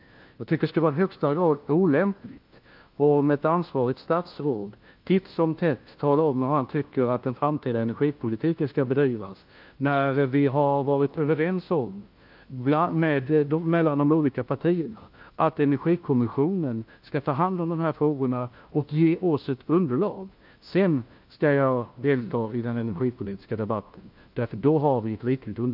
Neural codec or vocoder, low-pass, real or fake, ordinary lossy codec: codec, 16 kHz, 1 kbps, FunCodec, trained on LibriTTS, 50 frames a second; 5.4 kHz; fake; Opus, 24 kbps